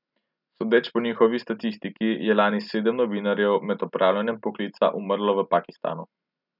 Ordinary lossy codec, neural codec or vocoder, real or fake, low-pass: none; none; real; 5.4 kHz